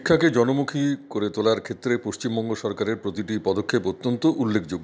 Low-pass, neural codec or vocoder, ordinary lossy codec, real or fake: none; none; none; real